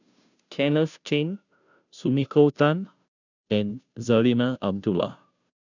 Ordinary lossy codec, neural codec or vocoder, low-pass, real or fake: none; codec, 16 kHz, 0.5 kbps, FunCodec, trained on Chinese and English, 25 frames a second; 7.2 kHz; fake